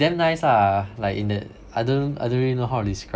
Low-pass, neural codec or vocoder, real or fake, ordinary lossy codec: none; none; real; none